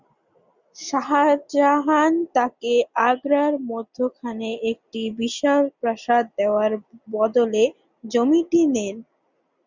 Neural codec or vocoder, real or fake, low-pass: none; real; 7.2 kHz